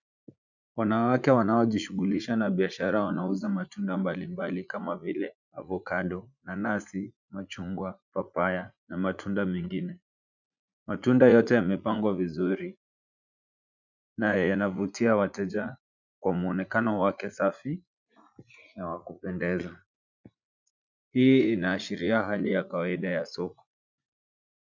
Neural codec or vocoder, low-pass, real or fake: vocoder, 44.1 kHz, 80 mel bands, Vocos; 7.2 kHz; fake